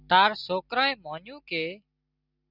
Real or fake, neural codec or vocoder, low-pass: real; none; 5.4 kHz